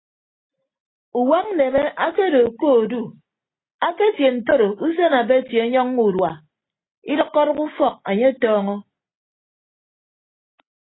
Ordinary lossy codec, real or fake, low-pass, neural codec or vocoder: AAC, 16 kbps; real; 7.2 kHz; none